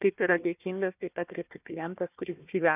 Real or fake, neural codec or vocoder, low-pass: fake; codec, 16 kHz, 1 kbps, FunCodec, trained on Chinese and English, 50 frames a second; 3.6 kHz